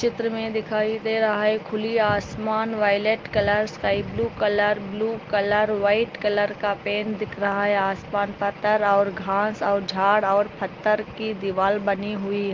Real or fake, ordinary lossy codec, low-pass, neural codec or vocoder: real; Opus, 32 kbps; 7.2 kHz; none